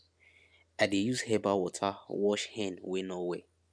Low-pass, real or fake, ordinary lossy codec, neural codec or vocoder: none; real; none; none